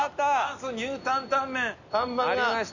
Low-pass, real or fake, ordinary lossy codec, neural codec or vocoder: 7.2 kHz; real; none; none